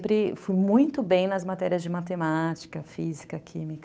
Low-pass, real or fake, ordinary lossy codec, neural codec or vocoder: none; fake; none; codec, 16 kHz, 8 kbps, FunCodec, trained on Chinese and English, 25 frames a second